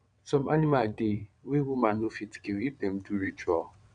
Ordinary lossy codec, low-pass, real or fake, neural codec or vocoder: none; 9.9 kHz; fake; vocoder, 22.05 kHz, 80 mel bands, WaveNeXt